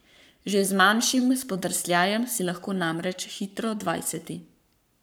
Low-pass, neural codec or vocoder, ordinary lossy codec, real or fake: none; codec, 44.1 kHz, 7.8 kbps, Pupu-Codec; none; fake